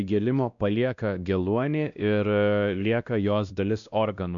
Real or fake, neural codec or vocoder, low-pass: fake; codec, 16 kHz, 1 kbps, X-Codec, WavLM features, trained on Multilingual LibriSpeech; 7.2 kHz